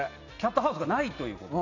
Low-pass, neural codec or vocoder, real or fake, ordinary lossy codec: 7.2 kHz; none; real; none